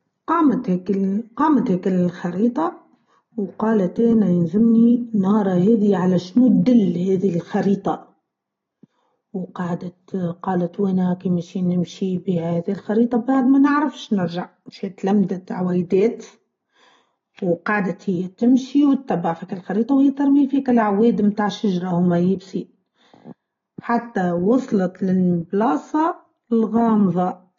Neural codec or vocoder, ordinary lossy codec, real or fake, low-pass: none; AAC, 32 kbps; real; 7.2 kHz